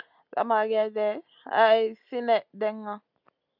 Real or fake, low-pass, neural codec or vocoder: real; 5.4 kHz; none